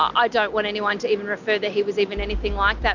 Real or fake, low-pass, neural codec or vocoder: real; 7.2 kHz; none